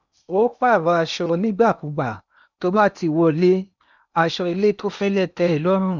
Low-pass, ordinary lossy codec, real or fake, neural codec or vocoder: 7.2 kHz; none; fake; codec, 16 kHz in and 24 kHz out, 0.8 kbps, FocalCodec, streaming, 65536 codes